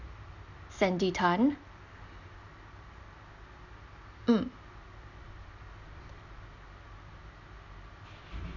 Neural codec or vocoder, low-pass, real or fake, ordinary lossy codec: none; 7.2 kHz; real; none